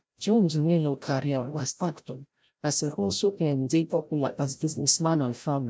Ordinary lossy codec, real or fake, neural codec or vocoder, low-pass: none; fake; codec, 16 kHz, 0.5 kbps, FreqCodec, larger model; none